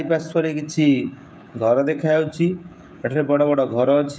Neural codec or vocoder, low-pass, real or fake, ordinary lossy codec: codec, 16 kHz, 16 kbps, FreqCodec, smaller model; none; fake; none